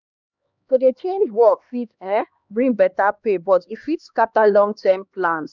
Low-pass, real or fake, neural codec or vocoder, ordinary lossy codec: 7.2 kHz; fake; codec, 16 kHz, 2 kbps, X-Codec, HuBERT features, trained on LibriSpeech; none